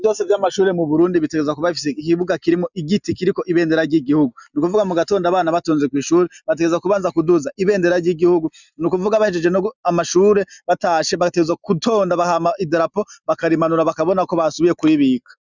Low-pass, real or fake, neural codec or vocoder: 7.2 kHz; real; none